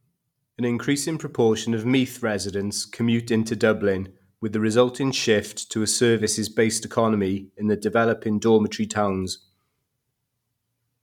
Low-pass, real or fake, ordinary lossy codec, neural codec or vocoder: 19.8 kHz; real; none; none